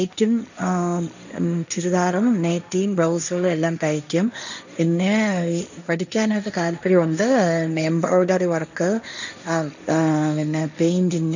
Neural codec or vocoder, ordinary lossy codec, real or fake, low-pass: codec, 16 kHz, 1.1 kbps, Voila-Tokenizer; none; fake; 7.2 kHz